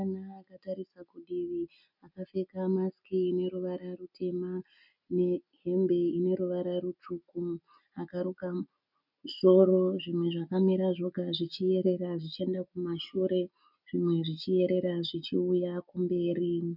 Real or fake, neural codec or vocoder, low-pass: real; none; 5.4 kHz